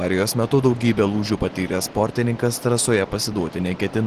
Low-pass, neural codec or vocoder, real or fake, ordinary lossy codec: 14.4 kHz; none; real; Opus, 16 kbps